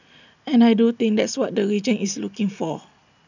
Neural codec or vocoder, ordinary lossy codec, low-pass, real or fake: none; none; 7.2 kHz; real